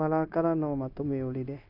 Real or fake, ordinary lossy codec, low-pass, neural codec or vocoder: fake; none; 5.4 kHz; codec, 16 kHz, 0.9 kbps, LongCat-Audio-Codec